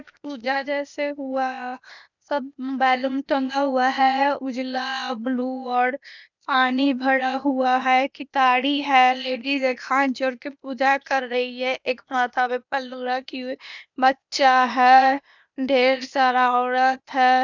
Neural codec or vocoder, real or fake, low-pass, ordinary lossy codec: codec, 16 kHz, 0.8 kbps, ZipCodec; fake; 7.2 kHz; none